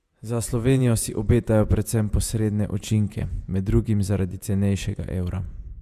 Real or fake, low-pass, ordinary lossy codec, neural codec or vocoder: fake; 14.4 kHz; Opus, 64 kbps; vocoder, 48 kHz, 128 mel bands, Vocos